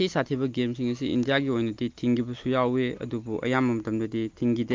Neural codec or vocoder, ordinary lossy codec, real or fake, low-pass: none; Opus, 32 kbps; real; 7.2 kHz